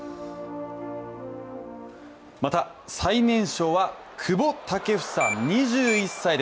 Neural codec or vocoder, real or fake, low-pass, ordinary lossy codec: none; real; none; none